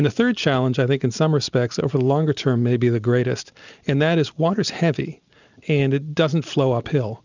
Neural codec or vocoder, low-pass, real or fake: none; 7.2 kHz; real